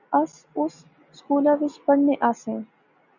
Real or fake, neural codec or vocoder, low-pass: real; none; 7.2 kHz